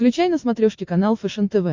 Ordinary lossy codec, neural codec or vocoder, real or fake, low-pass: MP3, 48 kbps; none; real; 7.2 kHz